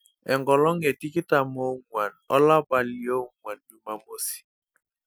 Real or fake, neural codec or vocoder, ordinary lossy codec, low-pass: real; none; none; none